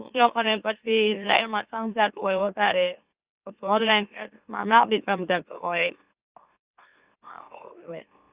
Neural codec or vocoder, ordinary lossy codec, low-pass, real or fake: autoencoder, 44.1 kHz, a latent of 192 numbers a frame, MeloTTS; Opus, 64 kbps; 3.6 kHz; fake